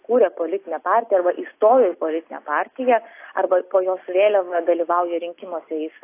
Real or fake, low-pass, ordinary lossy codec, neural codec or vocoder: real; 3.6 kHz; AAC, 24 kbps; none